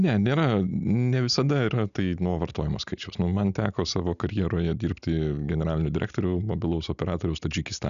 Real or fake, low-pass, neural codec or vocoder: real; 7.2 kHz; none